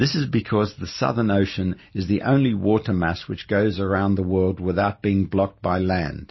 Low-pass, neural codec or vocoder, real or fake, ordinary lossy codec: 7.2 kHz; none; real; MP3, 24 kbps